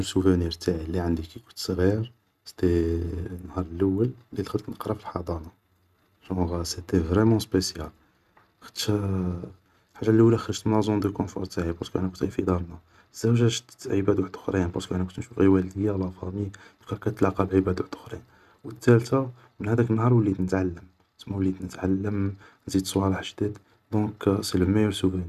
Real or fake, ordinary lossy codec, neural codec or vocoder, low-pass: real; none; none; 14.4 kHz